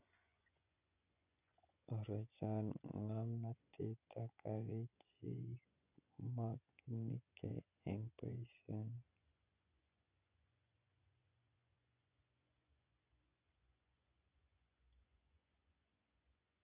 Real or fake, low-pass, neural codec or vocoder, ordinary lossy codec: fake; 3.6 kHz; vocoder, 24 kHz, 100 mel bands, Vocos; none